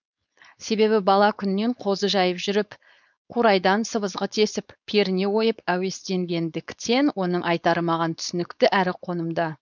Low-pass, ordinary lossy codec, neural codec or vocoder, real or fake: 7.2 kHz; none; codec, 16 kHz, 4.8 kbps, FACodec; fake